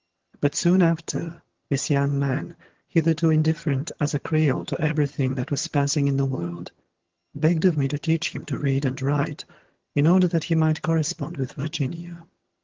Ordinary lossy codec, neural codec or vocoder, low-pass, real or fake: Opus, 16 kbps; vocoder, 22.05 kHz, 80 mel bands, HiFi-GAN; 7.2 kHz; fake